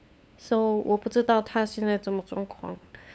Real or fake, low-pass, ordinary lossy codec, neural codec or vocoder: fake; none; none; codec, 16 kHz, 8 kbps, FunCodec, trained on LibriTTS, 25 frames a second